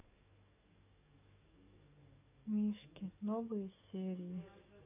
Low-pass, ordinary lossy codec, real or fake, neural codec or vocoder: 3.6 kHz; none; fake; codec, 44.1 kHz, 7.8 kbps, DAC